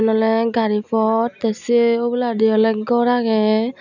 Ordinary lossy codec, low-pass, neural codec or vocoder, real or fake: none; 7.2 kHz; none; real